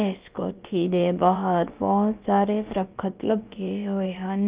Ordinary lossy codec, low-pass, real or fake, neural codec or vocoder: Opus, 24 kbps; 3.6 kHz; fake; codec, 16 kHz, about 1 kbps, DyCAST, with the encoder's durations